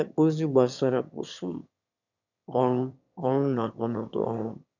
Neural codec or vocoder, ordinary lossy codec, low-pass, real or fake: autoencoder, 22.05 kHz, a latent of 192 numbers a frame, VITS, trained on one speaker; none; 7.2 kHz; fake